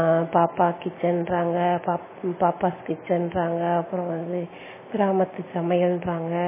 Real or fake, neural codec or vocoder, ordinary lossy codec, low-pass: real; none; MP3, 16 kbps; 3.6 kHz